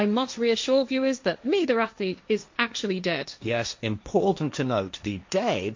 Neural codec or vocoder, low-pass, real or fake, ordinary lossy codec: codec, 16 kHz, 1.1 kbps, Voila-Tokenizer; 7.2 kHz; fake; MP3, 48 kbps